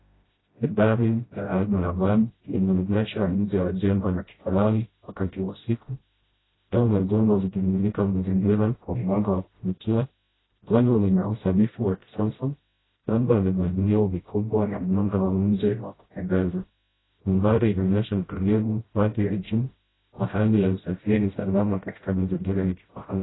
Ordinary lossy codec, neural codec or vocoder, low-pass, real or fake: AAC, 16 kbps; codec, 16 kHz, 0.5 kbps, FreqCodec, smaller model; 7.2 kHz; fake